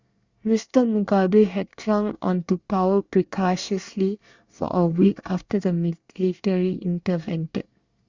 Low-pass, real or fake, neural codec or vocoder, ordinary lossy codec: 7.2 kHz; fake; codec, 24 kHz, 1 kbps, SNAC; Opus, 64 kbps